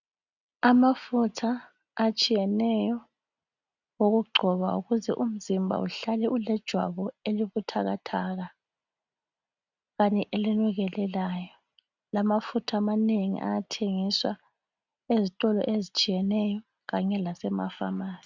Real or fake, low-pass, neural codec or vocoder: real; 7.2 kHz; none